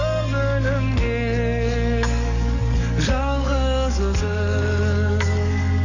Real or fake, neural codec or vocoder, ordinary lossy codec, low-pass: fake; codec, 44.1 kHz, 7.8 kbps, DAC; none; 7.2 kHz